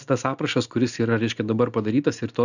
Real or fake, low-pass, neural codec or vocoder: real; 7.2 kHz; none